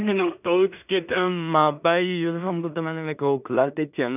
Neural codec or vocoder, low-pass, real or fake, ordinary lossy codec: codec, 16 kHz in and 24 kHz out, 0.4 kbps, LongCat-Audio-Codec, two codebook decoder; 3.6 kHz; fake; none